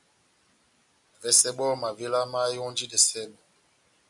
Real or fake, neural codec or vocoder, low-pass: real; none; 10.8 kHz